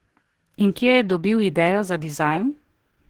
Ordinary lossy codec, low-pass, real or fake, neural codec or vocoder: Opus, 16 kbps; 19.8 kHz; fake; codec, 44.1 kHz, 2.6 kbps, DAC